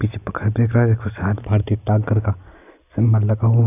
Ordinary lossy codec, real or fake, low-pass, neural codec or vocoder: none; real; 3.6 kHz; none